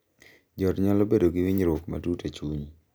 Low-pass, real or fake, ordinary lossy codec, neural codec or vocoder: none; real; none; none